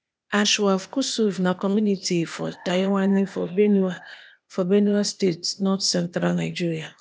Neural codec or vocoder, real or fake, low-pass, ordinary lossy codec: codec, 16 kHz, 0.8 kbps, ZipCodec; fake; none; none